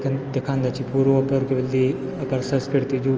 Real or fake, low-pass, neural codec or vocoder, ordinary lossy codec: real; 7.2 kHz; none; Opus, 24 kbps